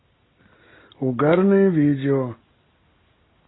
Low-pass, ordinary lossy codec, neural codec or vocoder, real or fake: 7.2 kHz; AAC, 16 kbps; none; real